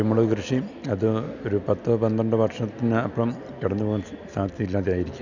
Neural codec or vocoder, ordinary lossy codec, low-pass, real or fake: none; none; 7.2 kHz; real